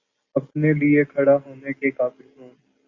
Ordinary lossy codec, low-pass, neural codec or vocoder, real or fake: Opus, 64 kbps; 7.2 kHz; none; real